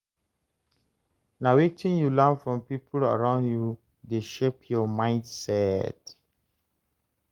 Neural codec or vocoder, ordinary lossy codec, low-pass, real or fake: codec, 44.1 kHz, 7.8 kbps, Pupu-Codec; Opus, 32 kbps; 19.8 kHz; fake